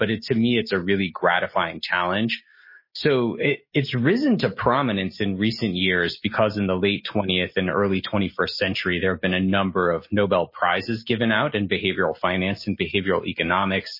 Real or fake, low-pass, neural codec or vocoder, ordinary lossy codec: real; 5.4 kHz; none; MP3, 24 kbps